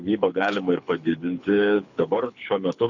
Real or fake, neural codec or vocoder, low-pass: fake; codec, 24 kHz, 6 kbps, HILCodec; 7.2 kHz